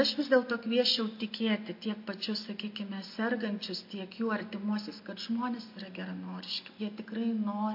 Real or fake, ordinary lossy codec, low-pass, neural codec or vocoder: real; MP3, 32 kbps; 5.4 kHz; none